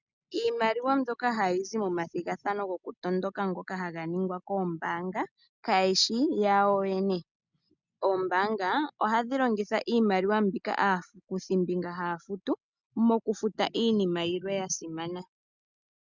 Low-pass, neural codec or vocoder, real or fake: 7.2 kHz; none; real